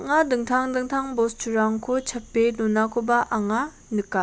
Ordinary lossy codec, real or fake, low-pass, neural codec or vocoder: none; real; none; none